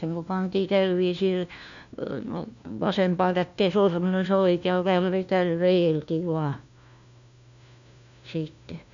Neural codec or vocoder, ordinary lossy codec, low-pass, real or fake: codec, 16 kHz, 1 kbps, FunCodec, trained on LibriTTS, 50 frames a second; MP3, 96 kbps; 7.2 kHz; fake